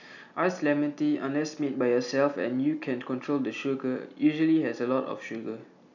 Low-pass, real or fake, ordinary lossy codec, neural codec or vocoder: 7.2 kHz; real; none; none